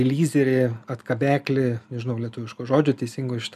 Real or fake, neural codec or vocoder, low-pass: real; none; 14.4 kHz